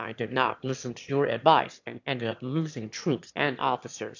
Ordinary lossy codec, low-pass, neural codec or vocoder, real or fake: AAC, 48 kbps; 7.2 kHz; autoencoder, 22.05 kHz, a latent of 192 numbers a frame, VITS, trained on one speaker; fake